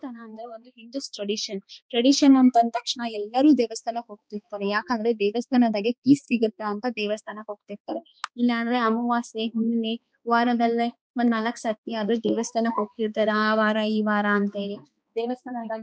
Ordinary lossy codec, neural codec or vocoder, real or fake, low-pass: none; codec, 16 kHz, 2 kbps, X-Codec, HuBERT features, trained on balanced general audio; fake; none